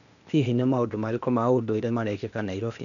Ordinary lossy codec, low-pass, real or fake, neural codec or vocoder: none; 7.2 kHz; fake; codec, 16 kHz, 0.8 kbps, ZipCodec